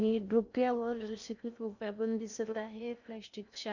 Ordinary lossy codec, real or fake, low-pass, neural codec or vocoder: none; fake; 7.2 kHz; codec, 16 kHz in and 24 kHz out, 0.6 kbps, FocalCodec, streaming, 2048 codes